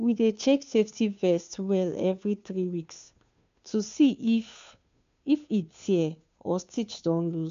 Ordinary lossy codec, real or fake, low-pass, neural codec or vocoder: MP3, 64 kbps; fake; 7.2 kHz; codec, 16 kHz, 2 kbps, FunCodec, trained on Chinese and English, 25 frames a second